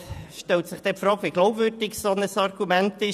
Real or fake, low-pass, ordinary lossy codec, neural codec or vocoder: fake; 14.4 kHz; none; vocoder, 48 kHz, 128 mel bands, Vocos